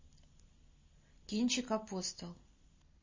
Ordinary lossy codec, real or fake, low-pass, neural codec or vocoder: MP3, 32 kbps; real; 7.2 kHz; none